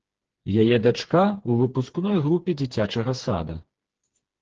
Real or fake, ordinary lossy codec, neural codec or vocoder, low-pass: fake; Opus, 16 kbps; codec, 16 kHz, 4 kbps, FreqCodec, smaller model; 7.2 kHz